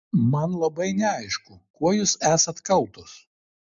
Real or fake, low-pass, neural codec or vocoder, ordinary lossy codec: real; 7.2 kHz; none; MP3, 64 kbps